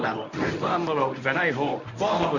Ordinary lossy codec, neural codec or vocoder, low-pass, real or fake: none; codec, 24 kHz, 0.9 kbps, WavTokenizer, medium speech release version 1; 7.2 kHz; fake